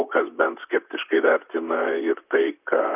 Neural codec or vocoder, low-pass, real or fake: vocoder, 44.1 kHz, 128 mel bands, Pupu-Vocoder; 3.6 kHz; fake